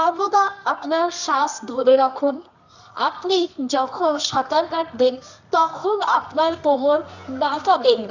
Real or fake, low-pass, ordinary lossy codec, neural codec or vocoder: fake; 7.2 kHz; none; codec, 24 kHz, 0.9 kbps, WavTokenizer, medium music audio release